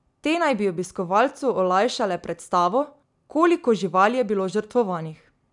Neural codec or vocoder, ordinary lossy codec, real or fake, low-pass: none; none; real; 10.8 kHz